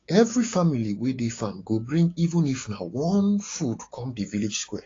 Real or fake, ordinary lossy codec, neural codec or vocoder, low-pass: fake; AAC, 32 kbps; codec, 16 kHz, 6 kbps, DAC; 7.2 kHz